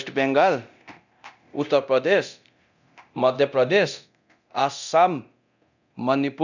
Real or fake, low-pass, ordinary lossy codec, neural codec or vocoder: fake; 7.2 kHz; none; codec, 24 kHz, 0.9 kbps, DualCodec